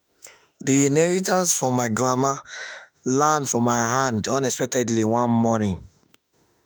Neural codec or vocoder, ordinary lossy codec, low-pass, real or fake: autoencoder, 48 kHz, 32 numbers a frame, DAC-VAE, trained on Japanese speech; none; none; fake